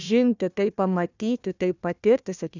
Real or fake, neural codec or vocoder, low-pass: fake; codec, 16 kHz, 1 kbps, FunCodec, trained on Chinese and English, 50 frames a second; 7.2 kHz